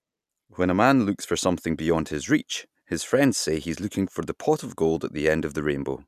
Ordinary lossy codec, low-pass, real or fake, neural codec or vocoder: none; 14.4 kHz; real; none